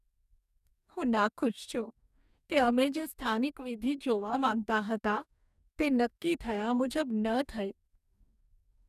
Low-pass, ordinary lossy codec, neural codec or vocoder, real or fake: 14.4 kHz; none; codec, 44.1 kHz, 2.6 kbps, DAC; fake